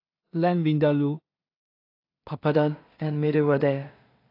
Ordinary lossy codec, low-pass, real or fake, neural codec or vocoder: AAC, 32 kbps; 5.4 kHz; fake; codec, 16 kHz in and 24 kHz out, 0.4 kbps, LongCat-Audio-Codec, two codebook decoder